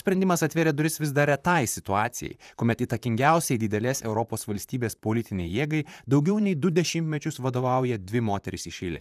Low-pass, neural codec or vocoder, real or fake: 14.4 kHz; vocoder, 44.1 kHz, 128 mel bands, Pupu-Vocoder; fake